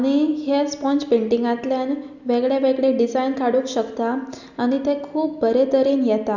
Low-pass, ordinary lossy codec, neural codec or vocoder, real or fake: 7.2 kHz; none; none; real